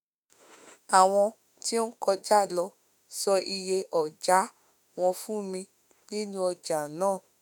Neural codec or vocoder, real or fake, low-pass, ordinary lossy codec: autoencoder, 48 kHz, 32 numbers a frame, DAC-VAE, trained on Japanese speech; fake; none; none